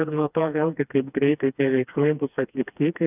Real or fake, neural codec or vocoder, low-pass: fake; codec, 16 kHz, 2 kbps, FreqCodec, smaller model; 3.6 kHz